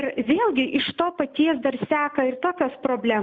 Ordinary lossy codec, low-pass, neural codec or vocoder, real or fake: Opus, 64 kbps; 7.2 kHz; none; real